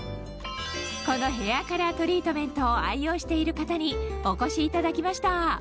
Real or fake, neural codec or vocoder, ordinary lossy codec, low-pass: real; none; none; none